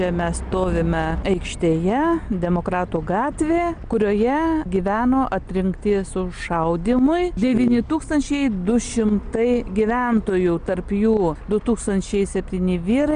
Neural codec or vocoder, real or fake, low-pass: none; real; 9.9 kHz